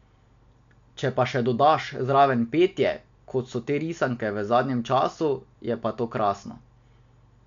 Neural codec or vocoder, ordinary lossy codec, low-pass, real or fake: none; MP3, 64 kbps; 7.2 kHz; real